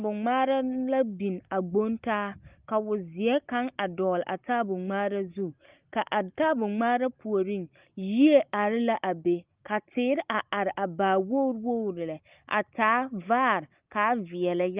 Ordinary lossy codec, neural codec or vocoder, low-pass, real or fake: Opus, 24 kbps; none; 3.6 kHz; real